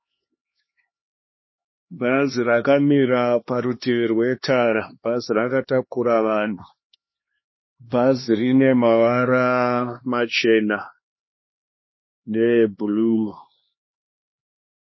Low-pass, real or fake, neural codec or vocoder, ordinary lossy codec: 7.2 kHz; fake; codec, 16 kHz, 2 kbps, X-Codec, HuBERT features, trained on LibriSpeech; MP3, 24 kbps